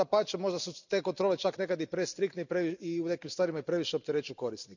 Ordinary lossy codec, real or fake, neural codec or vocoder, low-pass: none; real; none; 7.2 kHz